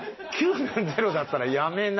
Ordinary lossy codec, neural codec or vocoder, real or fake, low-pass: MP3, 24 kbps; none; real; 7.2 kHz